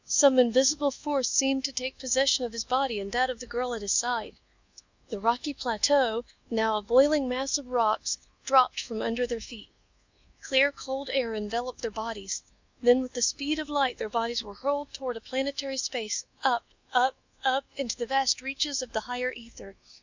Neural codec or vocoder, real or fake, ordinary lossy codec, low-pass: codec, 24 kHz, 1.2 kbps, DualCodec; fake; Opus, 64 kbps; 7.2 kHz